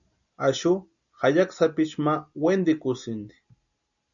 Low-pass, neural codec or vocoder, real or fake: 7.2 kHz; none; real